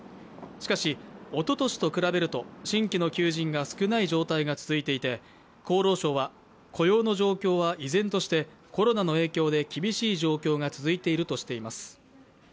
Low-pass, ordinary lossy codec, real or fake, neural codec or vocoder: none; none; real; none